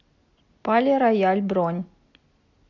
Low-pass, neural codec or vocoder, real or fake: 7.2 kHz; none; real